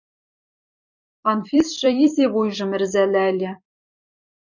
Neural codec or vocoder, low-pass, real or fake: vocoder, 44.1 kHz, 128 mel bands every 256 samples, BigVGAN v2; 7.2 kHz; fake